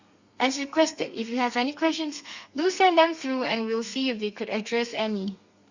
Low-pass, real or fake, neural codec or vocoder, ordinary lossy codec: 7.2 kHz; fake; codec, 32 kHz, 1.9 kbps, SNAC; Opus, 64 kbps